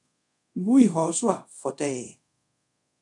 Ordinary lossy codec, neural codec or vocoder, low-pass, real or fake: MP3, 96 kbps; codec, 24 kHz, 0.5 kbps, DualCodec; 10.8 kHz; fake